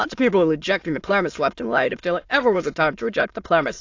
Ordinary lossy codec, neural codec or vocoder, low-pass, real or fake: AAC, 48 kbps; autoencoder, 22.05 kHz, a latent of 192 numbers a frame, VITS, trained on many speakers; 7.2 kHz; fake